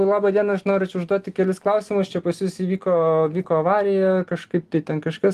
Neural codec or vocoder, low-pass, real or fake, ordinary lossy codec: none; 14.4 kHz; real; Opus, 24 kbps